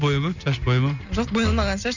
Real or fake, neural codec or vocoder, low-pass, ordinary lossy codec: real; none; 7.2 kHz; none